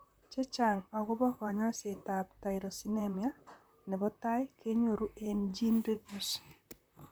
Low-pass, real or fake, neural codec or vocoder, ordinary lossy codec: none; fake; vocoder, 44.1 kHz, 128 mel bands, Pupu-Vocoder; none